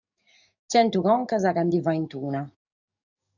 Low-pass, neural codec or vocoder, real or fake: 7.2 kHz; codec, 44.1 kHz, 7.8 kbps, DAC; fake